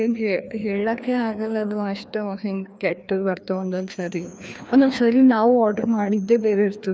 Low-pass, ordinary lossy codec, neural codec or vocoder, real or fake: none; none; codec, 16 kHz, 2 kbps, FreqCodec, larger model; fake